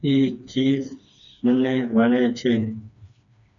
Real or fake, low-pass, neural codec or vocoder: fake; 7.2 kHz; codec, 16 kHz, 2 kbps, FreqCodec, smaller model